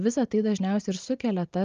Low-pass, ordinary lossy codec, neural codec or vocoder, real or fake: 7.2 kHz; Opus, 32 kbps; none; real